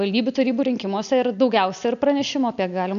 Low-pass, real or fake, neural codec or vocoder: 7.2 kHz; real; none